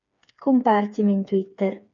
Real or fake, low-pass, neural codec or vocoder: fake; 7.2 kHz; codec, 16 kHz, 4 kbps, FreqCodec, smaller model